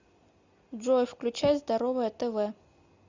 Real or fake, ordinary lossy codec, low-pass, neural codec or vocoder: real; Opus, 64 kbps; 7.2 kHz; none